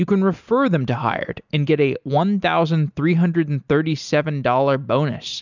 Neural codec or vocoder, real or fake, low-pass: none; real; 7.2 kHz